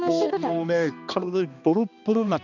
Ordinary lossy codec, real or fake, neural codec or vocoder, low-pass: none; fake; codec, 16 kHz, 2 kbps, X-Codec, HuBERT features, trained on balanced general audio; 7.2 kHz